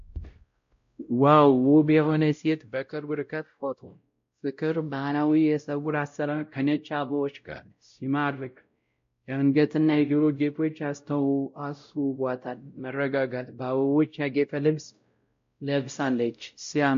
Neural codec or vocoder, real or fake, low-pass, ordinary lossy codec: codec, 16 kHz, 0.5 kbps, X-Codec, WavLM features, trained on Multilingual LibriSpeech; fake; 7.2 kHz; MP3, 48 kbps